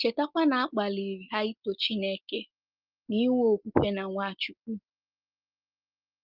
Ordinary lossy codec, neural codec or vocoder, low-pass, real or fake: Opus, 32 kbps; none; 5.4 kHz; real